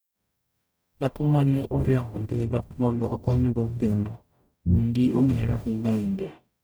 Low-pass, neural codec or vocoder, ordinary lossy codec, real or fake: none; codec, 44.1 kHz, 0.9 kbps, DAC; none; fake